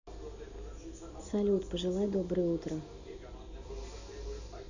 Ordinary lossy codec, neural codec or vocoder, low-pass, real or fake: none; none; 7.2 kHz; real